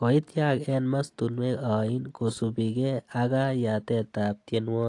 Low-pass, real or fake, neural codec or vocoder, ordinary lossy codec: 10.8 kHz; real; none; AAC, 48 kbps